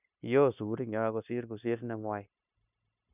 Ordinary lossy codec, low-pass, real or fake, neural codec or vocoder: none; 3.6 kHz; fake; codec, 16 kHz, 0.9 kbps, LongCat-Audio-Codec